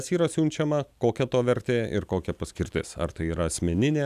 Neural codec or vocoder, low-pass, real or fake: none; 14.4 kHz; real